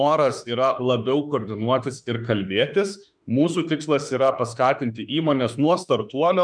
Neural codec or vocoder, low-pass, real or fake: autoencoder, 48 kHz, 32 numbers a frame, DAC-VAE, trained on Japanese speech; 9.9 kHz; fake